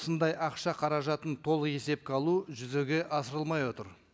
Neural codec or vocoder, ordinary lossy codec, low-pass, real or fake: none; none; none; real